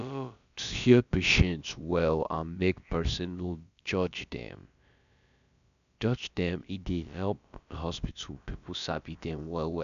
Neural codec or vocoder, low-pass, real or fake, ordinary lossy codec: codec, 16 kHz, about 1 kbps, DyCAST, with the encoder's durations; 7.2 kHz; fake; none